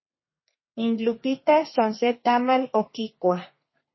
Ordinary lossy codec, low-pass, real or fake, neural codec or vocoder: MP3, 24 kbps; 7.2 kHz; fake; codec, 32 kHz, 1.9 kbps, SNAC